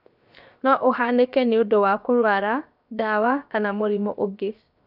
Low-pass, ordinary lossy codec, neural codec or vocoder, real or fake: 5.4 kHz; none; codec, 16 kHz, 0.7 kbps, FocalCodec; fake